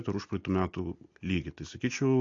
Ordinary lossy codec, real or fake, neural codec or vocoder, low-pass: AAC, 48 kbps; real; none; 7.2 kHz